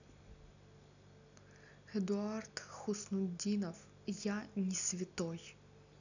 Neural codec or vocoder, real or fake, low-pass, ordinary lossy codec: none; real; 7.2 kHz; none